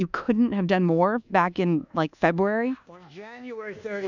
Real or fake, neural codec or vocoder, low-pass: fake; codec, 24 kHz, 1.2 kbps, DualCodec; 7.2 kHz